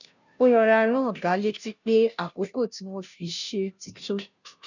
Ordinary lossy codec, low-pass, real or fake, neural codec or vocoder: none; 7.2 kHz; fake; codec, 16 kHz, 0.5 kbps, FunCodec, trained on Chinese and English, 25 frames a second